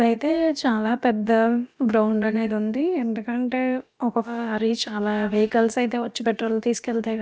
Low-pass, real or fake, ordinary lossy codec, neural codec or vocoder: none; fake; none; codec, 16 kHz, about 1 kbps, DyCAST, with the encoder's durations